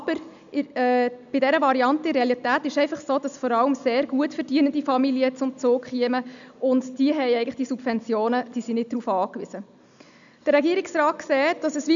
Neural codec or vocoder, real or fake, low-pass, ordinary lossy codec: none; real; 7.2 kHz; none